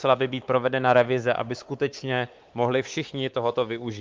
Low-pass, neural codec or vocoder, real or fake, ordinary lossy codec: 7.2 kHz; codec, 16 kHz, 4 kbps, X-Codec, WavLM features, trained on Multilingual LibriSpeech; fake; Opus, 24 kbps